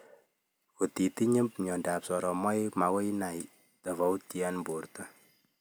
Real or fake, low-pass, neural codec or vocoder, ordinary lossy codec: real; none; none; none